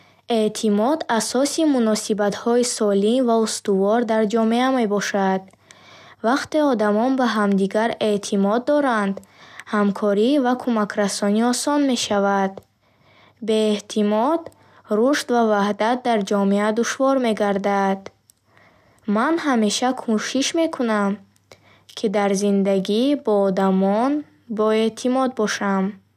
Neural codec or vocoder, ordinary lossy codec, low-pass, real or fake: none; none; 14.4 kHz; real